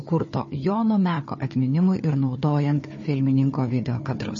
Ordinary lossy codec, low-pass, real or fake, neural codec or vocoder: MP3, 32 kbps; 7.2 kHz; fake; codec, 16 kHz, 4 kbps, FunCodec, trained on Chinese and English, 50 frames a second